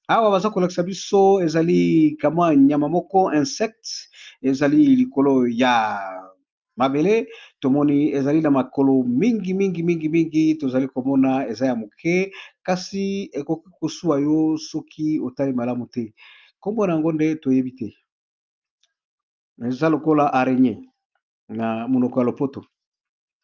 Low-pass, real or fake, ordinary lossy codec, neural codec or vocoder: 7.2 kHz; real; Opus, 24 kbps; none